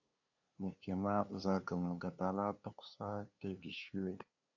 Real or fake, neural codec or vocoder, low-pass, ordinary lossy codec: fake; codec, 16 kHz, 2 kbps, FunCodec, trained on LibriTTS, 25 frames a second; 7.2 kHz; AAC, 48 kbps